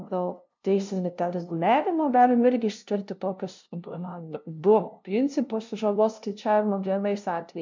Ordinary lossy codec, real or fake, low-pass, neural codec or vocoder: MP3, 64 kbps; fake; 7.2 kHz; codec, 16 kHz, 0.5 kbps, FunCodec, trained on LibriTTS, 25 frames a second